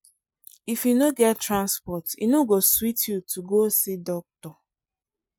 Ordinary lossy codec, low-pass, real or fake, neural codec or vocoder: none; none; real; none